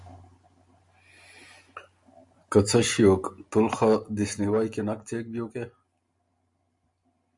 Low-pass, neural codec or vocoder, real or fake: 10.8 kHz; none; real